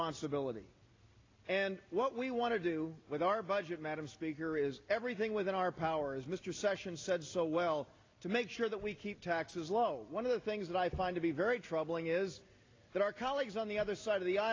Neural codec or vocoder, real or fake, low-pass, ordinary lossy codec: none; real; 7.2 kHz; AAC, 32 kbps